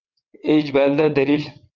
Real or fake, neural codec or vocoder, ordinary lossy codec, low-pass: fake; vocoder, 22.05 kHz, 80 mel bands, WaveNeXt; Opus, 32 kbps; 7.2 kHz